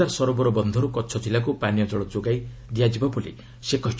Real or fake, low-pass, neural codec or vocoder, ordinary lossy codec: real; none; none; none